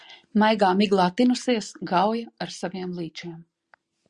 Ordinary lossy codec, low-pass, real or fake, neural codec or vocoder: Opus, 64 kbps; 10.8 kHz; real; none